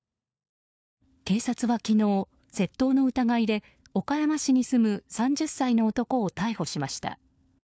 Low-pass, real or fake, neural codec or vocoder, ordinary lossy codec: none; fake; codec, 16 kHz, 4 kbps, FunCodec, trained on LibriTTS, 50 frames a second; none